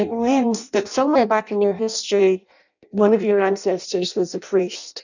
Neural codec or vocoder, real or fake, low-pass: codec, 16 kHz in and 24 kHz out, 0.6 kbps, FireRedTTS-2 codec; fake; 7.2 kHz